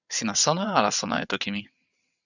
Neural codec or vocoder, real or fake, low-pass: vocoder, 22.05 kHz, 80 mel bands, WaveNeXt; fake; 7.2 kHz